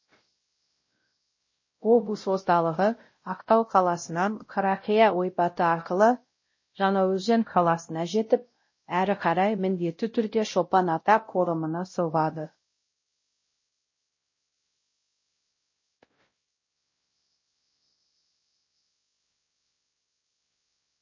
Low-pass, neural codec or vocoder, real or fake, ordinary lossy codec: 7.2 kHz; codec, 16 kHz, 0.5 kbps, X-Codec, WavLM features, trained on Multilingual LibriSpeech; fake; MP3, 32 kbps